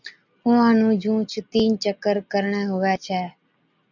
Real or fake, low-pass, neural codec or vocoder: real; 7.2 kHz; none